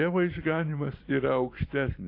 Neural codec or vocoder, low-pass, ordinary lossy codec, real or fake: vocoder, 44.1 kHz, 128 mel bands every 256 samples, BigVGAN v2; 5.4 kHz; AAC, 32 kbps; fake